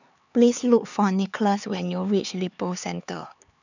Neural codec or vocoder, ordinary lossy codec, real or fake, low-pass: codec, 16 kHz, 4 kbps, X-Codec, HuBERT features, trained on LibriSpeech; none; fake; 7.2 kHz